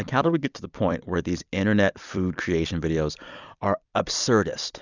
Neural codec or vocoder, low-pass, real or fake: vocoder, 44.1 kHz, 128 mel bands every 256 samples, BigVGAN v2; 7.2 kHz; fake